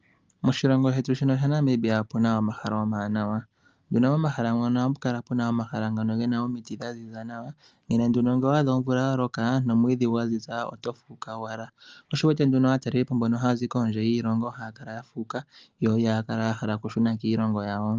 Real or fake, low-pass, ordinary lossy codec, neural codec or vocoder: real; 7.2 kHz; Opus, 24 kbps; none